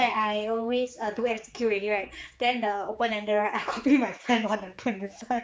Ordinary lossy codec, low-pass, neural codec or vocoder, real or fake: none; none; codec, 16 kHz, 4 kbps, X-Codec, HuBERT features, trained on general audio; fake